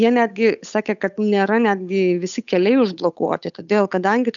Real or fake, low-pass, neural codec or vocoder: fake; 7.2 kHz; codec, 16 kHz, 8 kbps, FunCodec, trained on Chinese and English, 25 frames a second